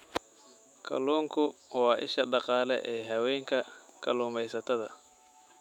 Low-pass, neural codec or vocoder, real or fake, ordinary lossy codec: 19.8 kHz; autoencoder, 48 kHz, 128 numbers a frame, DAC-VAE, trained on Japanese speech; fake; none